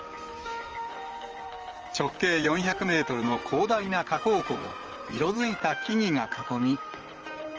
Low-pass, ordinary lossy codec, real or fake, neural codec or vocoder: 7.2 kHz; Opus, 24 kbps; fake; vocoder, 44.1 kHz, 128 mel bands, Pupu-Vocoder